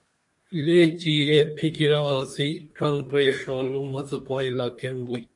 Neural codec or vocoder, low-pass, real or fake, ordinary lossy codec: codec, 24 kHz, 1 kbps, SNAC; 10.8 kHz; fake; MP3, 48 kbps